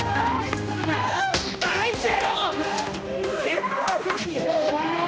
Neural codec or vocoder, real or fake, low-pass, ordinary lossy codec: codec, 16 kHz, 1 kbps, X-Codec, HuBERT features, trained on general audio; fake; none; none